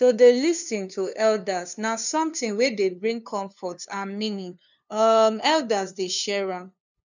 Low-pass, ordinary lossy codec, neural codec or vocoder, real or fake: 7.2 kHz; none; codec, 16 kHz, 2 kbps, FunCodec, trained on LibriTTS, 25 frames a second; fake